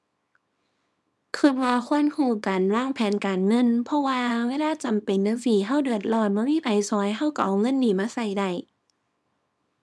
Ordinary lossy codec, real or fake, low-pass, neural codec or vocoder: none; fake; none; codec, 24 kHz, 0.9 kbps, WavTokenizer, small release